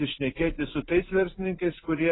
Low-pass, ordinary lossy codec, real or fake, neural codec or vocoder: 7.2 kHz; AAC, 16 kbps; real; none